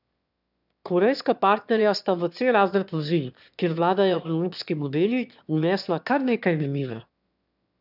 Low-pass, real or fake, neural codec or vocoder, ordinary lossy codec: 5.4 kHz; fake; autoencoder, 22.05 kHz, a latent of 192 numbers a frame, VITS, trained on one speaker; none